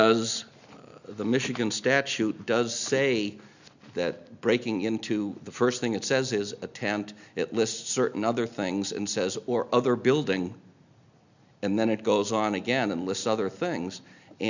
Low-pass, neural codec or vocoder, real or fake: 7.2 kHz; none; real